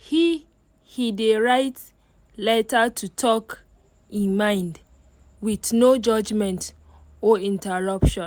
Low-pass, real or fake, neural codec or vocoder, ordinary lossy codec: none; real; none; none